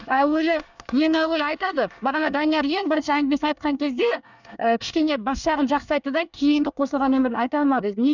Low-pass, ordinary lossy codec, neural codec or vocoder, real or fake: 7.2 kHz; none; codec, 24 kHz, 1 kbps, SNAC; fake